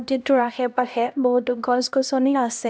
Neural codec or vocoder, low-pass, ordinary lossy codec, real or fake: codec, 16 kHz, 1 kbps, X-Codec, HuBERT features, trained on LibriSpeech; none; none; fake